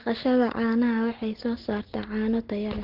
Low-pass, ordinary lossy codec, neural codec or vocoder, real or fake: 5.4 kHz; Opus, 16 kbps; none; real